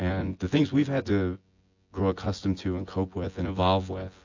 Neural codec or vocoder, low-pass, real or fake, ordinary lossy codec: vocoder, 24 kHz, 100 mel bands, Vocos; 7.2 kHz; fake; AAC, 48 kbps